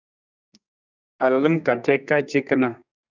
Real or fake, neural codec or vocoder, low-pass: fake; codec, 32 kHz, 1.9 kbps, SNAC; 7.2 kHz